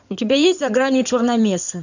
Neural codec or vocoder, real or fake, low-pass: codec, 16 kHz in and 24 kHz out, 2.2 kbps, FireRedTTS-2 codec; fake; 7.2 kHz